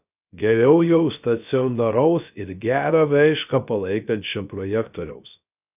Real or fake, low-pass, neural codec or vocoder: fake; 3.6 kHz; codec, 16 kHz, 0.3 kbps, FocalCodec